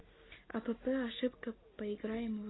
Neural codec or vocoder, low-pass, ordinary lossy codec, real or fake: none; 7.2 kHz; AAC, 16 kbps; real